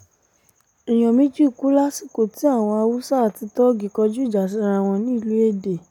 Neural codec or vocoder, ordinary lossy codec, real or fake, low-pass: none; none; real; 19.8 kHz